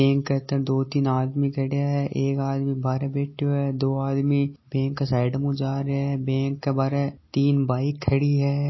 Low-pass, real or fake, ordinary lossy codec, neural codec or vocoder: 7.2 kHz; real; MP3, 24 kbps; none